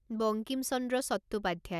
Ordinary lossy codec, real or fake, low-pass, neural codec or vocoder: none; real; 14.4 kHz; none